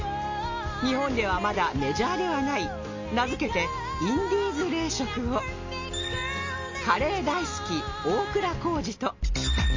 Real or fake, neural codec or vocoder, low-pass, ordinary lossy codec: real; none; 7.2 kHz; MP3, 32 kbps